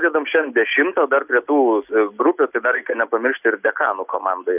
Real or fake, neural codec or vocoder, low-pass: real; none; 3.6 kHz